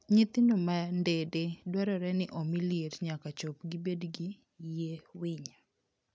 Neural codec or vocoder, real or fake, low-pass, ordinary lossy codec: none; real; none; none